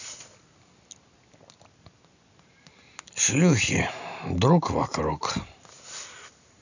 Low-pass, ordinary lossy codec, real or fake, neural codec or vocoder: 7.2 kHz; none; real; none